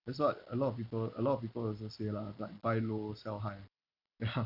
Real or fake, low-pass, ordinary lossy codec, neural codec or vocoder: fake; 5.4 kHz; none; vocoder, 44.1 kHz, 128 mel bands every 256 samples, BigVGAN v2